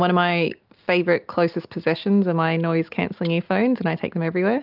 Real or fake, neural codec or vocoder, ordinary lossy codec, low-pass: real; none; Opus, 24 kbps; 5.4 kHz